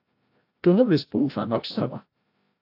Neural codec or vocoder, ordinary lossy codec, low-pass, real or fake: codec, 16 kHz, 0.5 kbps, FreqCodec, larger model; AAC, 32 kbps; 5.4 kHz; fake